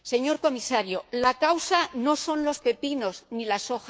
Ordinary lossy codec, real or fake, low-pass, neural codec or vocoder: none; fake; none; codec, 16 kHz, 6 kbps, DAC